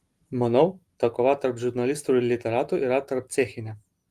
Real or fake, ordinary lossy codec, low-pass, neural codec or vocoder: real; Opus, 24 kbps; 14.4 kHz; none